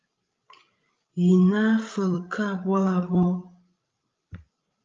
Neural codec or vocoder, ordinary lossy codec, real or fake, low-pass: codec, 16 kHz, 16 kbps, FreqCodec, larger model; Opus, 32 kbps; fake; 7.2 kHz